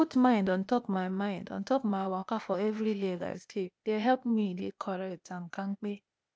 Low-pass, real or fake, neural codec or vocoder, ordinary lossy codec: none; fake; codec, 16 kHz, 0.8 kbps, ZipCodec; none